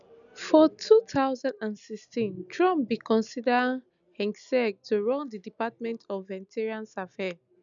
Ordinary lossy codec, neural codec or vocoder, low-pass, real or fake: none; none; 7.2 kHz; real